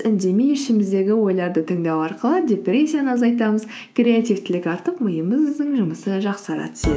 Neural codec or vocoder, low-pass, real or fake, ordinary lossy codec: codec, 16 kHz, 6 kbps, DAC; none; fake; none